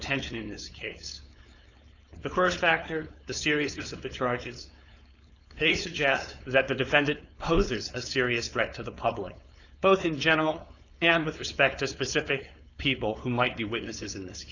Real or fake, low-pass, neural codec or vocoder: fake; 7.2 kHz; codec, 16 kHz, 4.8 kbps, FACodec